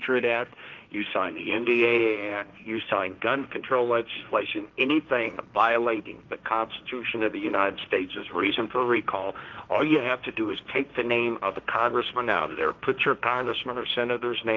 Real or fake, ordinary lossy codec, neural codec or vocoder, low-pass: fake; Opus, 32 kbps; codec, 16 kHz, 1.1 kbps, Voila-Tokenizer; 7.2 kHz